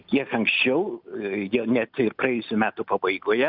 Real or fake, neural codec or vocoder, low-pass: real; none; 5.4 kHz